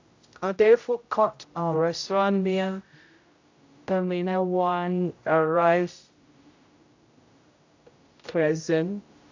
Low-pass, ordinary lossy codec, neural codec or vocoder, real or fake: 7.2 kHz; AAC, 48 kbps; codec, 16 kHz, 0.5 kbps, X-Codec, HuBERT features, trained on general audio; fake